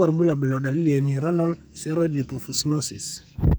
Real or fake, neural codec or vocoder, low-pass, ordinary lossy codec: fake; codec, 44.1 kHz, 2.6 kbps, SNAC; none; none